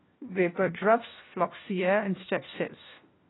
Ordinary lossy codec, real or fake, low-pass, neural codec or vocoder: AAC, 16 kbps; fake; 7.2 kHz; codec, 16 kHz, 1 kbps, FunCodec, trained on LibriTTS, 50 frames a second